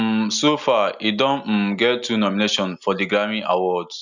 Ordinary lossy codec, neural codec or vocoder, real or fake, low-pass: none; none; real; 7.2 kHz